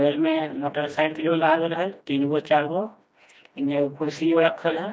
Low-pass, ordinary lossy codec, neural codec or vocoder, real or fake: none; none; codec, 16 kHz, 1 kbps, FreqCodec, smaller model; fake